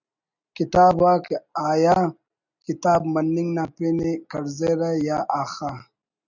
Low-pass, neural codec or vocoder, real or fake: 7.2 kHz; none; real